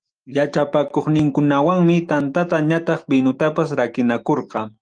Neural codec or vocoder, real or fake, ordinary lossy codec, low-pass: autoencoder, 48 kHz, 128 numbers a frame, DAC-VAE, trained on Japanese speech; fake; Opus, 32 kbps; 9.9 kHz